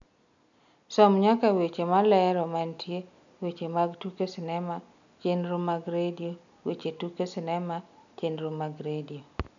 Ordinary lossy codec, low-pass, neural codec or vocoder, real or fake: none; 7.2 kHz; none; real